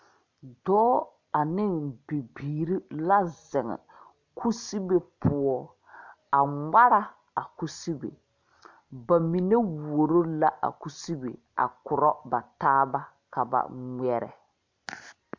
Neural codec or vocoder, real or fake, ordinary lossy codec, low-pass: none; real; MP3, 64 kbps; 7.2 kHz